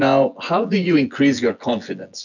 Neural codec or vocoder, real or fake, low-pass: vocoder, 24 kHz, 100 mel bands, Vocos; fake; 7.2 kHz